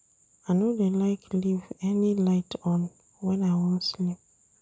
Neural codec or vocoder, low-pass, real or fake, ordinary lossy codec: none; none; real; none